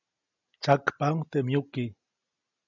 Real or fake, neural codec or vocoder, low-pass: real; none; 7.2 kHz